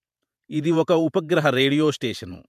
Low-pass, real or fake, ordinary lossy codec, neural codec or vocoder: 14.4 kHz; fake; MP3, 96 kbps; vocoder, 48 kHz, 128 mel bands, Vocos